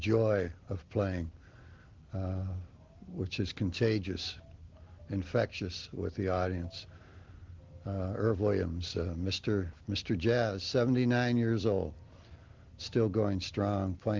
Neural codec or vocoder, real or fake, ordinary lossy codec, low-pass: none; real; Opus, 16 kbps; 7.2 kHz